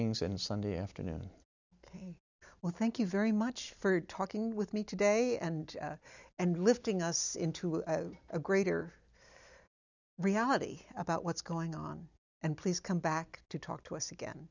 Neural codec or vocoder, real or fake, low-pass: none; real; 7.2 kHz